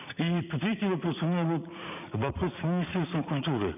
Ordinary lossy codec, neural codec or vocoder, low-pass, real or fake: none; vocoder, 44.1 kHz, 80 mel bands, Vocos; 3.6 kHz; fake